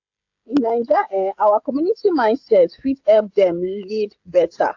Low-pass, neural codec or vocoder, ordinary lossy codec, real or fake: 7.2 kHz; codec, 16 kHz, 16 kbps, FreqCodec, smaller model; AAC, 48 kbps; fake